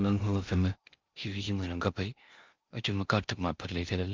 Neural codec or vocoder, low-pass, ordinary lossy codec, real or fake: codec, 16 kHz in and 24 kHz out, 0.6 kbps, FocalCodec, streaming, 4096 codes; 7.2 kHz; Opus, 32 kbps; fake